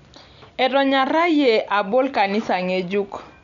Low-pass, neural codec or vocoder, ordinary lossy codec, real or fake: 7.2 kHz; none; none; real